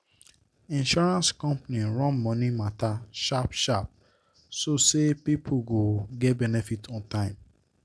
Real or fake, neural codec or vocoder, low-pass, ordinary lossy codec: real; none; none; none